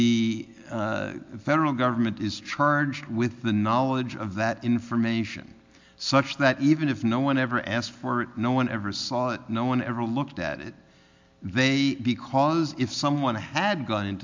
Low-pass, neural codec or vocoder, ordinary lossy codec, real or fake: 7.2 kHz; none; MP3, 64 kbps; real